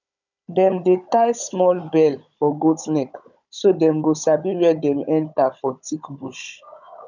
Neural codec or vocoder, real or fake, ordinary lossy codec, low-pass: codec, 16 kHz, 16 kbps, FunCodec, trained on Chinese and English, 50 frames a second; fake; none; 7.2 kHz